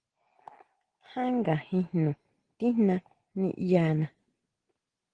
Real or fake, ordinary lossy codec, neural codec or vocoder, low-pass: real; Opus, 16 kbps; none; 9.9 kHz